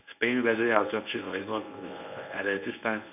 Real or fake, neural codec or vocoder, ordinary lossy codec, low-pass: fake; codec, 24 kHz, 0.9 kbps, WavTokenizer, medium speech release version 1; none; 3.6 kHz